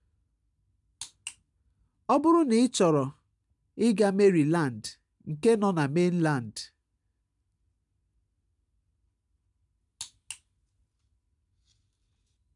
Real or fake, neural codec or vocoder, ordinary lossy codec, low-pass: real; none; none; 10.8 kHz